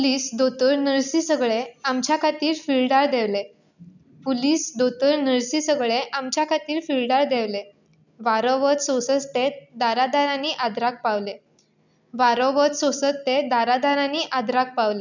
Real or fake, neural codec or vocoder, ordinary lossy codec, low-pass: real; none; none; 7.2 kHz